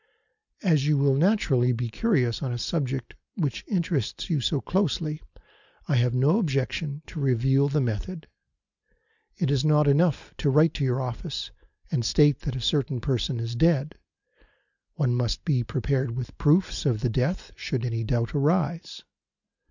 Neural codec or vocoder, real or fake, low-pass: none; real; 7.2 kHz